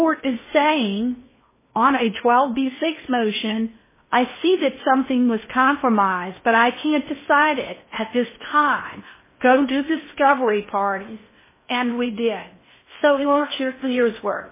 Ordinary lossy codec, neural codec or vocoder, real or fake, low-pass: MP3, 16 kbps; codec, 16 kHz in and 24 kHz out, 0.6 kbps, FocalCodec, streaming, 2048 codes; fake; 3.6 kHz